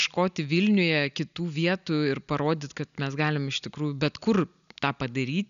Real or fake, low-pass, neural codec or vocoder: real; 7.2 kHz; none